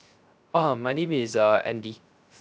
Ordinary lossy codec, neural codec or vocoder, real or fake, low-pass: none; codec, 16 kHz, 0.3 kbps, FocalCodec; fake; none